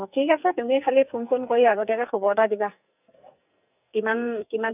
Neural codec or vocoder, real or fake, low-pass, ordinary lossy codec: codec, 44.1 kHz, 2.6 kbps, SNAC; fake; 3.6 kHz; none